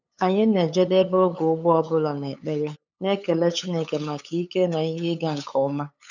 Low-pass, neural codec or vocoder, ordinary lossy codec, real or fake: 7.2 kHz; codec, 16 kHz, 8 kbps, FunCodec, trained on LibriTTS, 25 frames a second; none; fake